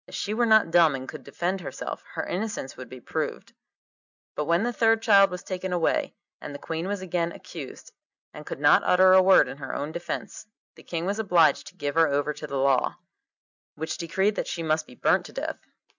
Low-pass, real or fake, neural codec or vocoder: 7.2 kHz; real; none